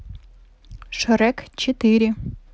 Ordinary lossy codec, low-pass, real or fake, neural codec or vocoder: none; none; real; none